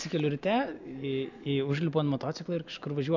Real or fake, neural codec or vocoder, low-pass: real; none; 7.2 kHz